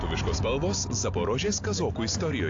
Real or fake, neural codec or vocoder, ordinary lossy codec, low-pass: real; none; AAC, 48 kbps; 7.2 kHz